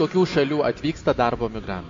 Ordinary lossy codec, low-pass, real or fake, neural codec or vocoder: MP3, 48 kbps; 7.2 kHz; real; none